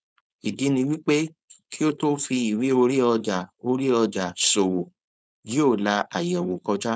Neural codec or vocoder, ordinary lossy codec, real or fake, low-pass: codec, 16 kHz, 4.8 kbps, FACodec; none; fake; none